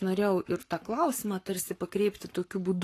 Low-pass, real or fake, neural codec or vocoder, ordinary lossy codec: 14.4 kHz; fake; codec, 44.1 kHz, 7.8 kbps, Pupu-Codec; AAC, 48 kbps